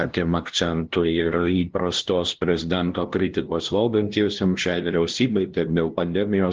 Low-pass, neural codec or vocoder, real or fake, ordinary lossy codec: 7.2 kHz; codec, 16 kHz, 0.5 kbps, FunCodec, trained on LibriTTS, 25 frames a second; fake; Opus, 16 kbps